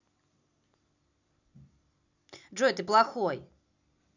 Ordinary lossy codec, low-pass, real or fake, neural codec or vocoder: none; 7.2 kHz; real; none